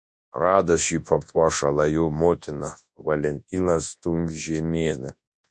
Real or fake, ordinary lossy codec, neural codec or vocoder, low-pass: fake; MP3, 48 kbps; codec, 24 kHz, 0.9 kbps, WavTokenizer, large speech release; 10.8 kHz